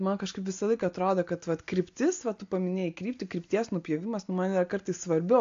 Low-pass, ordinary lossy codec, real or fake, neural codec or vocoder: 7.2 kHz; AAC, 48 kbps; real; none